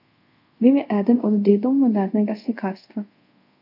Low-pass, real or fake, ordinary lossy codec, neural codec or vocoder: 5.4 kHz; fake; none; codec, 24 kHz, 0.5 kbps, DualCodec